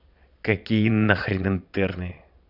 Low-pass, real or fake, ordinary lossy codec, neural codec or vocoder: 5.4 kHz; fake; none; vocoder, 22.05 kHz, 80 mel bands, Vocos